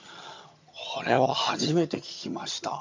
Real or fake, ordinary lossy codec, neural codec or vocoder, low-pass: fake; MP3, 64 kbps; vocoder, 22.05 kHz, 80 mel bands, HiFi-GAN; 7.2 kHz